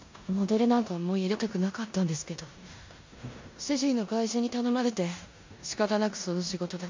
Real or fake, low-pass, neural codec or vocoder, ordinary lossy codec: fake; 7.2 kHz; codec, 16 kHz in and 24 kHz out, 0.9 kbps, LongCat-Audio-Codec, four codebook decoder; MP3, 48 kbps